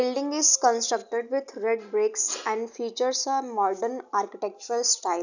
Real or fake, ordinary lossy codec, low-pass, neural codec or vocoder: real; none; 7.2 kHz; none